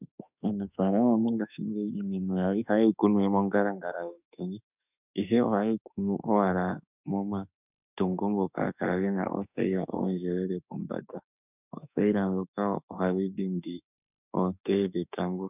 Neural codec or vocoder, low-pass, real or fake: autoencoder, 48 kHz, 32 numbers a frame, DAC-VAE, trained on Japanese speech; 3.6 kHz; fake